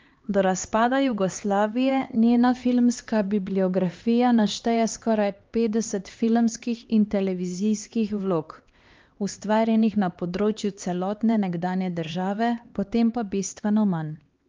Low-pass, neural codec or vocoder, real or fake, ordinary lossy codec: 7.2 kHz; codec, 16 kHz, 2 kbps, X-Codec, HuBERT features, trained on LibriSpeech; fake; Opus, 32 kbps